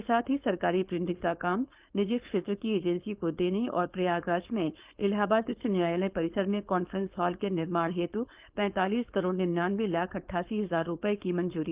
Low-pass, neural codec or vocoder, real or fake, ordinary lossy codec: 3.6 kHz; codec, 16 kHz, 4.8 kbps, FACodec; fake; Opus, 32 kbps